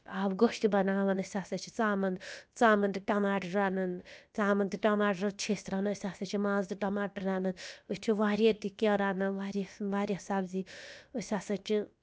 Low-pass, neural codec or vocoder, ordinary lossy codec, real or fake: none; codec, 16 kHz, about 1 kbps, DyCAST, with the encoder's durations; none; fake